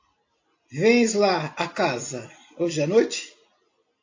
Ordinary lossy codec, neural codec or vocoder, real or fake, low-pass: AAC, 48 kbps; none; real; 7.2 kHz